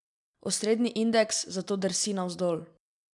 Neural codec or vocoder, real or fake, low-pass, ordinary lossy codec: none; real; 10.8 kHz; none